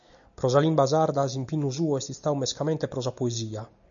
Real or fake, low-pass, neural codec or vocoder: real; 7.2 kHz; none